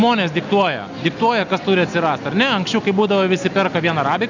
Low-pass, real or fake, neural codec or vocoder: 7.2 kHz; real; none